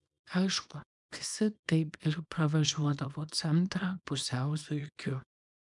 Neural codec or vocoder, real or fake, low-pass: codec, 24 kHz, 0.9 kbps, WavTokenizer, small release; fake; 10.8 kHz